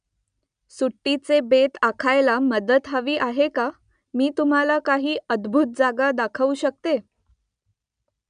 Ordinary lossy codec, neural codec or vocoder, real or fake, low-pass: none; none; real; 9.9 kHz